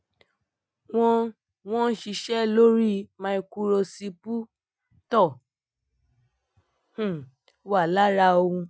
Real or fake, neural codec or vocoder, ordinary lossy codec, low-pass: real; none; none; none